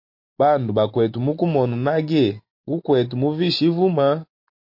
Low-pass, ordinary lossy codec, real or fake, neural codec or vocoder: 5.4 kHz; MP3, 32 kbps; real; none